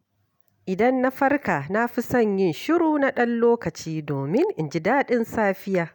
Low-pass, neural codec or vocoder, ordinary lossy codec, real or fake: 19.8 kHz; none; none; real